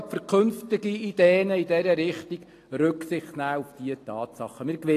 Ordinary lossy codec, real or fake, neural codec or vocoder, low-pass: AAC, 48 kbps; real; none; 14.4 kHz